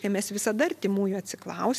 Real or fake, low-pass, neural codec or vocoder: real; 14.4 kHz; none